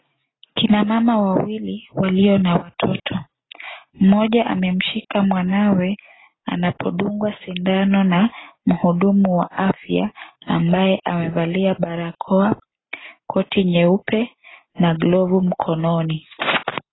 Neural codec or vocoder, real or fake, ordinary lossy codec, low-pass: none; real; AAC, 16 kbps; 7.2 kHz